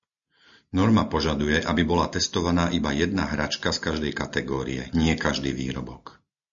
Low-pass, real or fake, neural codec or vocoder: 7.2 kHz; real; none